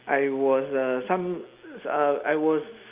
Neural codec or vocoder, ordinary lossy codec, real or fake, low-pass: none; Opus, 24 kbps; real; 3.6 kHz